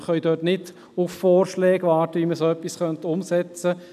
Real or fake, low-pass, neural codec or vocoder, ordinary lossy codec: real; 14.4 kHz; none; none